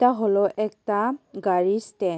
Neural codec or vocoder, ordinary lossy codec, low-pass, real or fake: none; none; none; real